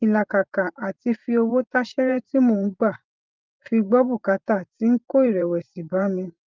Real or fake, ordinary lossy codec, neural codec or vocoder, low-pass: fake; Opus, 24 kbps; vocoder, 44.1 kHz, 128 mel bands every 512 samples, BigVGAN v2; 7.2 kHz